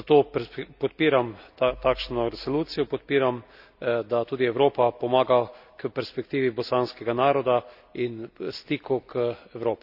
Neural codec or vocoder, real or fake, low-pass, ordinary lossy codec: none; real; 5.4 kHz; none